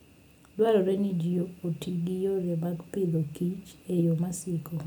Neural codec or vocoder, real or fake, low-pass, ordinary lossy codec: none; real; none; none